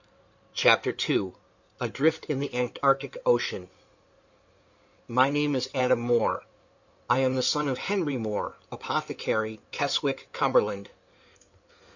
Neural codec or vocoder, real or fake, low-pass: codec, 16 kHz in and 24 kHz out, 2.2 kbps, FireRedTTS-2 codec; fake; 7.2 kHz